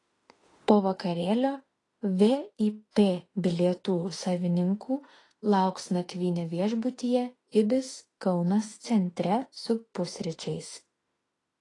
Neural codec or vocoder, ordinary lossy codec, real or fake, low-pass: autoencoder, 48 kHz, 32 numbers a frame, DAC-VAE, trained on Japanese speech; AAC, 32 kbps; fake; 10.8 kHz